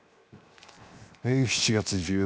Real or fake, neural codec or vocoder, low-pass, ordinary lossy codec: fake; codec, 16 kHz, 0.7 kbps, FocalCodec; none; none